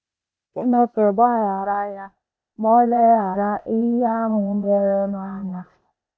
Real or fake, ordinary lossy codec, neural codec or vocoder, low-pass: fake; none; codec, 16 kHz, 0.8 kbps, ZipCodec; none